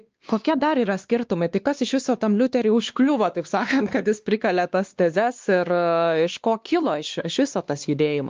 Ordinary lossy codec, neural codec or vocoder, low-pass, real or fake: Opus, 24 kbps; codec, 16 kHz, 2 kbps, X-Codec, WavLM features, trained on Multilingual LibriSpeech; 7.2 kHz; fake